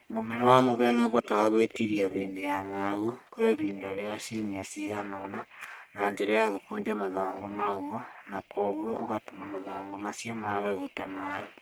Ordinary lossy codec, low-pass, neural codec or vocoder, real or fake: none; none; codec, 44.1 kHz, 1.7 kbps, Pupu-Codec; fake